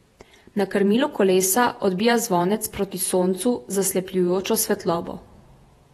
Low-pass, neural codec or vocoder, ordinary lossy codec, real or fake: 19.8 kHz; vocoder, 48 kHz, 128 mel bands, Vocos; AAC, 32 kbps; fake